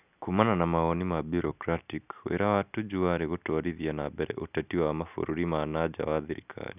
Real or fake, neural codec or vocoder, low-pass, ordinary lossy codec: real; none; 3.6 kHz; none